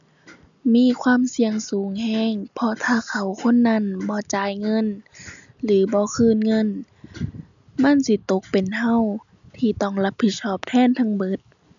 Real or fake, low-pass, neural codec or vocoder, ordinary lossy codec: real; 7.2 kHz; none; none